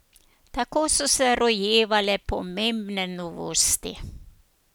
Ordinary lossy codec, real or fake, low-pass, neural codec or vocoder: none; real; none; none